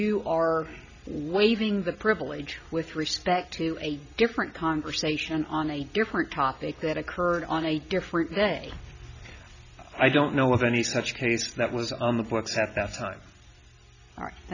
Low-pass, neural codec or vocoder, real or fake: 7.2 kHz; none; real